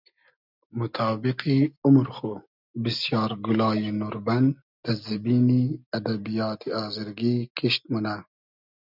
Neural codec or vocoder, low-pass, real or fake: none; 5.4 kHz; real